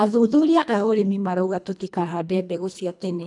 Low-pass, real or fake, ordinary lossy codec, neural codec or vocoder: 10.8 kHz; fake; none; codec, 24 kHz, 1.5 kbps, HILCodec